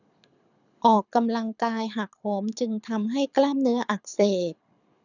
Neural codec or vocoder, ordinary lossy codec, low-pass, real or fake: codec, 24 kHz, 6 kbps, HILCodec; none; 7.2 kHz; fake